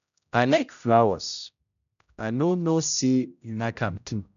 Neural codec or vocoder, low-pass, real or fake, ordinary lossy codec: codec, 16 kHz, 0.5 kbps, X-Codec, HuBERT features, trained on general audio; 7.2 kHz; fake; none